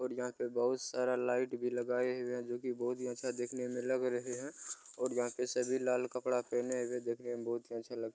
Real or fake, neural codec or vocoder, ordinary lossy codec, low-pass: real; none; none; none